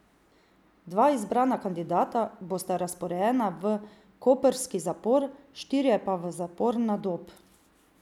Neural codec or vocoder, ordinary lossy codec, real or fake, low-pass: none; none; real; 19.8 kHz